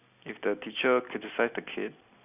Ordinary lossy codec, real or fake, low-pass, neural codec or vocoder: none; real; 3.6 kHz; none